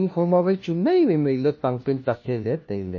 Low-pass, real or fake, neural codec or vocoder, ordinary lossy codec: 7.2 kHz; fake; codec, 16 kHz, 1 kbps, FunCodec, trained on LibriTTS, 50 frames a second; MP3, 32 kbps